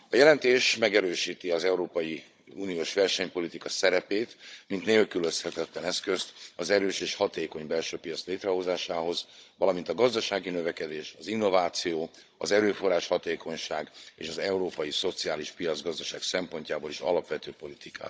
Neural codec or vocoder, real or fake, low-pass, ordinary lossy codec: codec, 16 kHz, 16 kbps, FunCodec, trained on Chinese and English, 50 frames a second; fake; none; none